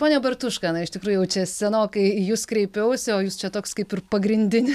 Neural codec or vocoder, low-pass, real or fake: none; 14.4 kHz; real